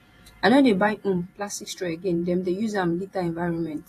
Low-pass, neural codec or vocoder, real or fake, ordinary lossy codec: 14.4 kHz; vocoder, 48 kHz, 128 mel bands, Vocos; fake; AAC, 48 kbps